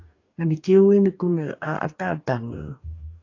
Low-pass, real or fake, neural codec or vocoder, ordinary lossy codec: 7.2 kHz; fake; codec, 44.1 kHz, 2.6 kbps, DAC; AAC, 48 kbps